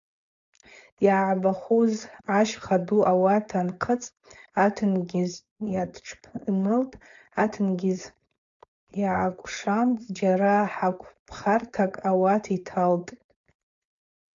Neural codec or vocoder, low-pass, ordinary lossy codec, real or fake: codec, 16 kHz, 4.8 kbps, FACodec; 7.2 kHz; AAC, 64 kbps; fake